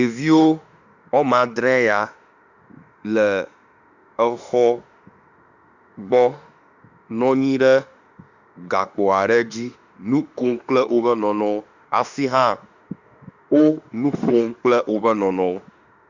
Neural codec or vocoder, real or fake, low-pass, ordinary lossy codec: autoencoder, 48 kHz, 32 numbers a frame, DAC-VAE, trained on Japanese speech; fake; 7.2 kHz; Opus, 64 kbps